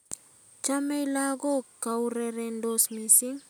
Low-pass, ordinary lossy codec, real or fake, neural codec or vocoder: none; none; real; none